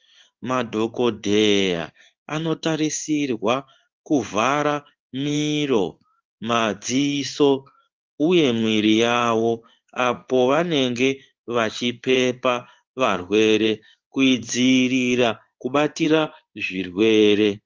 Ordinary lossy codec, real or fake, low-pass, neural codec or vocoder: Opus, 32 kbps; fake; 7.2 kHz; codec, 16 kHz in and 24 kHz out, 1 kbps, XY-Tokenizer